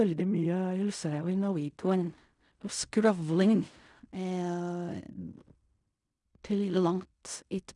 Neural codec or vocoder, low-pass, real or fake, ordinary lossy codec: codec, 16 kHz in and 24 kHz out, 0.4 kbps, LongCat-Audio-Codec, fine tuned four codebook decoder; 10.8 kHz; fake; none